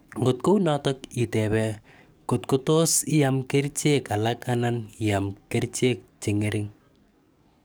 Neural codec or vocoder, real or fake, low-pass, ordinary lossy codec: codec, 44.1 kHz, 7.8 kbps, DAC; fake; none; none